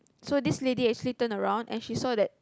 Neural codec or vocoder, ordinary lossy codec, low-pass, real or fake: none; none; none; real